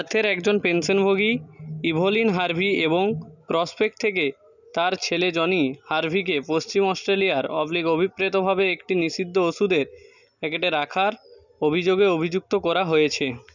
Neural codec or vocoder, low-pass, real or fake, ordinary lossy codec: none; 7.2 kHz; real; none